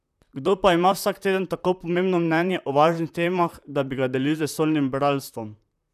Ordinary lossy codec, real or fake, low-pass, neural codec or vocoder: none; fake; 14.4 kHz; vocoder, 44.1 kHz, 128 mel bands, Pupu-Vocoder